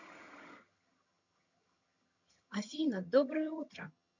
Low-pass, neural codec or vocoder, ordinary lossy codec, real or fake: 7.2 kHz; vocoder, 22.05 kHz, 80 mel bands, HiFi-GAN; none; fake